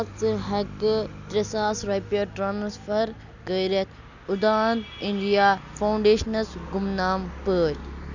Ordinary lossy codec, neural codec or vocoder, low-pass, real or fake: none; none; 7.2 kHz; real